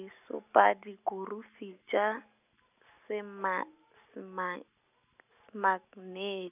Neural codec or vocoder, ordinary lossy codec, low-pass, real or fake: none; none; 3.6 kHz; real